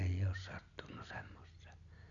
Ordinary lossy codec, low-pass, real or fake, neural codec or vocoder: none; 7.2 kHz; real; none